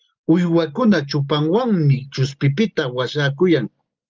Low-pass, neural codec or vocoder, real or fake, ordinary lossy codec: 7.2 kHz; none; real; Opus, 24 kbps